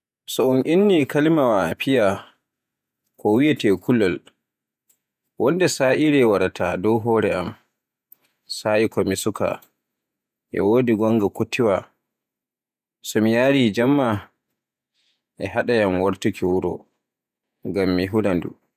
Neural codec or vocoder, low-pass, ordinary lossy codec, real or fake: vocoder, 48 kHz, 128 mel bands, Vocos; 14.4 kHz; none; fake